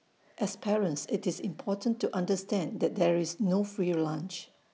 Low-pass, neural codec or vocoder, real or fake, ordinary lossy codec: none; none; real; none